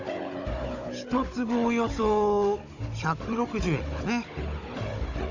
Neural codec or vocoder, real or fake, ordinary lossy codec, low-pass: codec, 16 kHz, 16 kbps, FunCodec, trained on Chinese and English, 50 frames a second; fake; none; 7.2 kHz